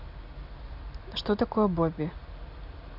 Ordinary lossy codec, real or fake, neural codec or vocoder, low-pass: none; real; none; 5.4 kHz